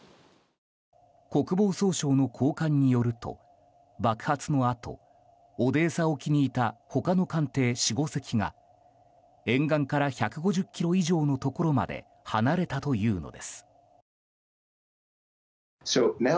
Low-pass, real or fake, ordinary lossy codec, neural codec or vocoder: none; real; none; none